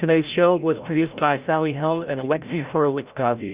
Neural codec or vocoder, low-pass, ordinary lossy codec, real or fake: codec, 16 kHz, 0.5 kbps, FreqCodec, larger model; 3.6 kHz; Opus, 64 kbps; fake